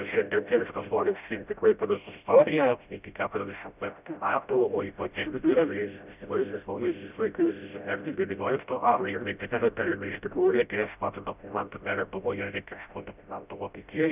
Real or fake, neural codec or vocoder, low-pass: fake; codec, 16 kHz, 0.5 kbps, FreqCodec, smaller model; 3.6 kHz